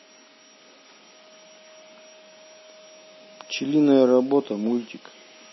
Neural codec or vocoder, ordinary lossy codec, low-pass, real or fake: none; MP3, 24 kbps; 7.2 kHz; real